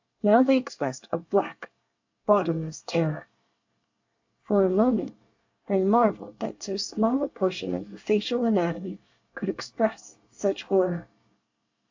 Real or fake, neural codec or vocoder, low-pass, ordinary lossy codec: fake; codec, 24 kHz, 1 kbps, SNAC; 7.2 kHz; AAC, 48 kbps